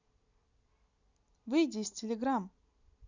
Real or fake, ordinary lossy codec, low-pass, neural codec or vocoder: real; none; 7.2 kHz; none